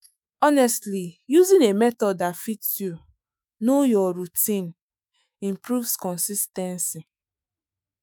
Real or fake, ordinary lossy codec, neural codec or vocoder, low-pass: fake; none; autoencoder, 48 kHz, 128 numbers a frame, DAC-VAE, trained on Japanese speech; none